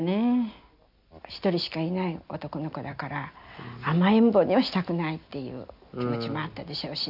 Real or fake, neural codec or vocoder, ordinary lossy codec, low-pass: real; none; none; 5.4 kHz